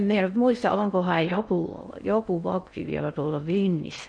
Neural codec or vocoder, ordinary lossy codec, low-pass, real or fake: codec, 16 kHz in and 24 kHz out, 0.6 kbps, FocalCodec, streaming, 4096 codes; none; 9.9 kHz; fake